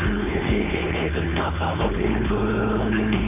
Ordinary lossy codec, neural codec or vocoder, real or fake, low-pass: none; codec, 16 kHz, 4.8 kbps, FACodec; fake; 3.6 kHz